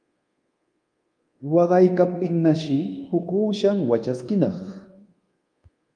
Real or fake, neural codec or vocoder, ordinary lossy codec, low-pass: fake; codec, 24 kHz, 1.2 kbps, DualCodec; Opus, 32 kbps; 9.9 kHz